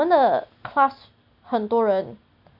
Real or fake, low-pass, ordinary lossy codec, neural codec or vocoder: real; 5.4 kHz; none; none